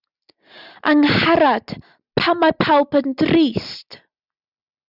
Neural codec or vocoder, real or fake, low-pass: none; real; 5.4 kHz